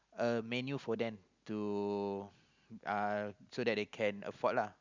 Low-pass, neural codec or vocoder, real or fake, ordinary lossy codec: 7.2 kHz; none; real; Opus, 64 kbps